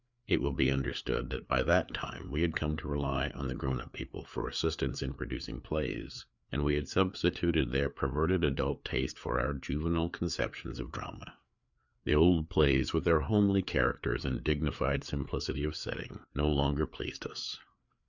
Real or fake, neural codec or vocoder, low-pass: fake; codec, 16 kHz, 4 kbps, FreqCodec, larger model; 7.2 kHz